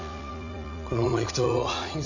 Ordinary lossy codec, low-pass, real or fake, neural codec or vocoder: none; 7.2 kHz; fake; vocoder, 44.1 kHz, 80 mel bands, Vocos